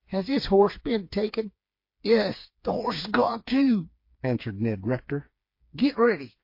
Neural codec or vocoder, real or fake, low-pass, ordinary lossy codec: codec, 16 kHz, 4 kbps, FreqCodec, smaller model; fake; 5.4 kHz; MP3, 32 kbps